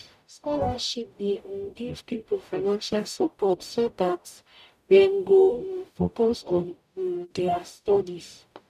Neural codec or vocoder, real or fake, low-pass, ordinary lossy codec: codec, 44.1 kHz, 0.9 kbps, DAC; fake; 14.4 kHz; none